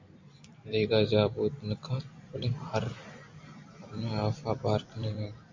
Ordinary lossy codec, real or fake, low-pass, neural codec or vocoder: MP3, 64 kbps; fake; 7.2 kHz; vocoder, 44.1 kHz, 128 mel bands every 256 samples, BigVGAN v2